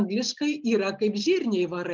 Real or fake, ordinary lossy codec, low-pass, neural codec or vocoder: real; Opus, 24 kbps; 7.2 kHz; none